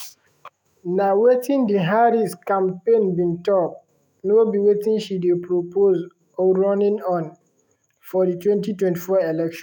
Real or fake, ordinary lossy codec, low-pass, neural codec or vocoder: fake; none; none; autoencoder, 48 kHz, 128 numbers a frame, DAC-VAE, trained on Japanese speech